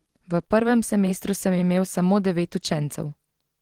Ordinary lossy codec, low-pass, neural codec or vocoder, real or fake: Opus, 16 kbps; 19.8 kHz; vocoder, 44.1 kHz, 128 mel bands every 512 samples, BigVGAN v2; fake